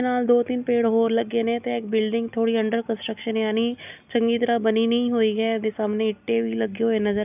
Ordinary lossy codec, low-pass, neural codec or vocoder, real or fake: none; 3.6 kHz; none; real